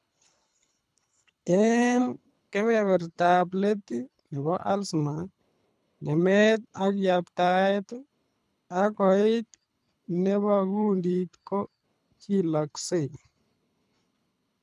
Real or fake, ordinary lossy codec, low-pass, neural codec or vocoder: fake; none; none; codec, 24 kHz, 3 kbps, HILCodec